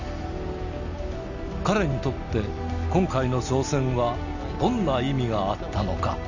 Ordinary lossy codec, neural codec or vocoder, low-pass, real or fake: none; none; 7.2 kHz; real